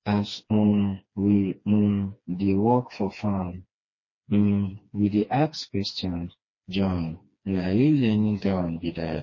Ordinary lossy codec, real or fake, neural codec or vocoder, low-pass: MP3, 32 kbps; fake; codec, 16 kHz, 2 kbps, FreqCodec, smaller model; 7.2 kHz